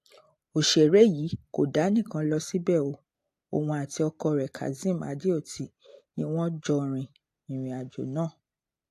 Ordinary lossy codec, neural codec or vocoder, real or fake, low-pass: AAC, 64 kbps; none; real; 14.4 kHz